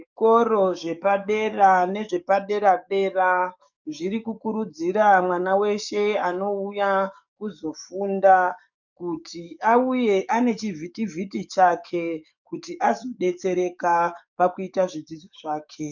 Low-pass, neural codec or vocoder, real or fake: 7.2 kHz; codec, 44.1 kHz, 7.8 kbps, DAC; fake